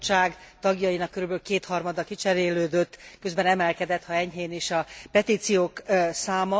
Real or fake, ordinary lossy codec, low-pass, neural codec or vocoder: real; none; none; none